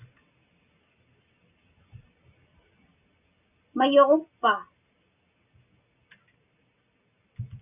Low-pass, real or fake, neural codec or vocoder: 3.6 kHz; real; none